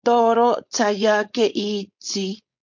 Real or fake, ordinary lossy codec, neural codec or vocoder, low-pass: fake; MP3, 48 kbps; codec, 16 kHz, 4.8 kbps, FACodec; 7.2 kHz